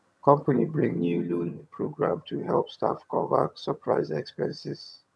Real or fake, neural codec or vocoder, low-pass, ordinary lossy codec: fake; vocoder, 22.05 kHz, 80 mel bands, HiFi-GAN; none; none